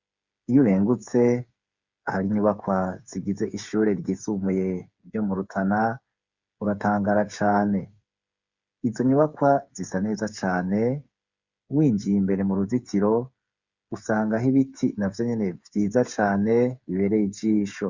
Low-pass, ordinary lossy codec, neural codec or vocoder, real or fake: 7.2 kHz; Opus, 64 kbps; codec, 16 kHz, 8 kbps, FreqCodec, smaller model; fake